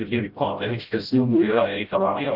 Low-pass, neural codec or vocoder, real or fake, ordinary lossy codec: 5.4 kHz; codec, 16 kHz, 0.5 kbps, FreqCodec, smaller model; fake; Opus, 24 kbps